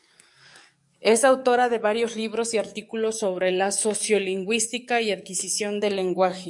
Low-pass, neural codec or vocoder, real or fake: 10.8 kHz; codec, 44.1 kHz, 7.8 kbps, DAC; fake